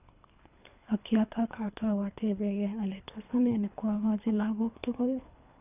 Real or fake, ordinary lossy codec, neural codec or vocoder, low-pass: fake; none; codec, 24 kHz, 3 kbps, HILCodec; 3.6 kHz